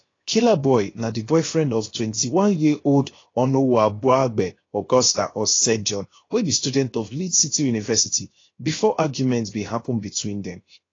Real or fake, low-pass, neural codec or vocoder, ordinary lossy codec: fake; 7.2 kHz; codec, 16 kHz, 0.7 kbps, FocalCodec; AAC, 32 kbps